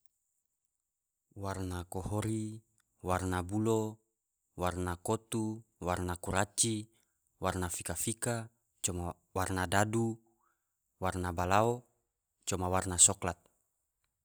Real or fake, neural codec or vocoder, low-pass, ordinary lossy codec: real; none; none; none